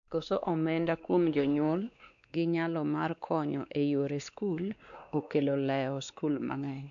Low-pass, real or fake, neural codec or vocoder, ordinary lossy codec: 7.2 kHz; fake; codec, 16 kHz, 2 kbps, X-Codec, WavLM features, trained on Multilingual LibriSpeech; none